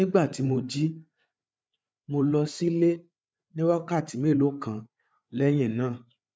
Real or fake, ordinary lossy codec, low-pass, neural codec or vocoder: fake; none; none; codec, 16 kHz, 8 kbps, FreqCodec, larger model